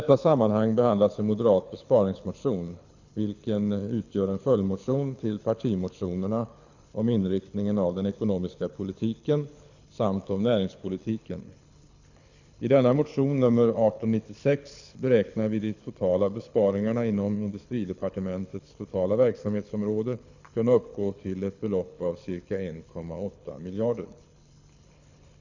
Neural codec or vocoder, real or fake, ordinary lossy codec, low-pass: codec, 24 kHz, 6 kbps, HILCodec; fake; none; 7.2 kHz